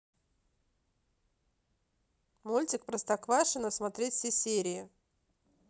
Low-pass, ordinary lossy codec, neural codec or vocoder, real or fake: none; none; none; real